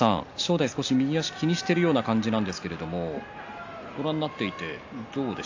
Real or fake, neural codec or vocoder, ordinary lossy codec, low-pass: real; none; AAC, 48 kbps; 7.2 kHz